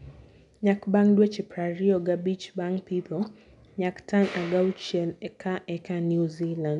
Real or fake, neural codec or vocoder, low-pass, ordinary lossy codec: real; none; 10.8 kHz; none